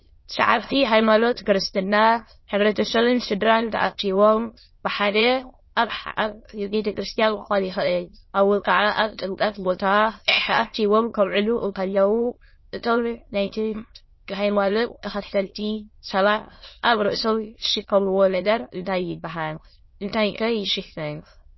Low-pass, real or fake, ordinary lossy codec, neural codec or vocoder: 7.2 kHz; fake; MP3, 24 kbps; autoencoder, 22.05 kHz, a latent of 192 numbers a frame, VITS, trained on many speakers